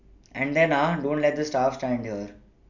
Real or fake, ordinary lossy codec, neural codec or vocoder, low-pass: real; none; none; 7.2 kHz